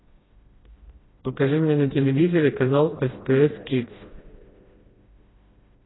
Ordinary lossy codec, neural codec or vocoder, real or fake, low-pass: AAC, 16 kbps; codec, 16 kHz, 1 kbps, FreqCodec, smaller model; fake; 7.2 kHz